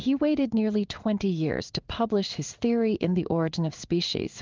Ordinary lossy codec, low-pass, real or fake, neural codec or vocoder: Opus, 32 kbps; 7.2 kHz; fake; codec, 16 kHz in and 24 kHz out, 1 kbps, XY-Tokenizer